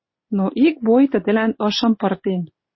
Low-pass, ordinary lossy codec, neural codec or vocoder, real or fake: 7.2 kHz; MP3, 24 kbps; none; real